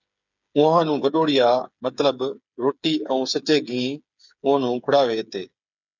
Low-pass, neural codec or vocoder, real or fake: 7.2 kHz; codec, 16 kHz, 8 kbps, FreqCodec, smaller model; fake